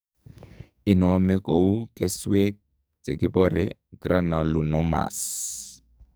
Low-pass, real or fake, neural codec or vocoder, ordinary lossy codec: none; fake; codec, 44.1 kHz, 2.6 kbps, SNAC; none